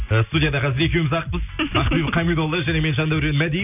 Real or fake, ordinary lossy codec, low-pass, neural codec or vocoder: real; none; 3.6 kHz; none